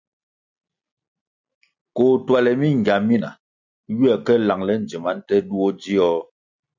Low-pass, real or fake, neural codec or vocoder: 7.2 kHz; real; none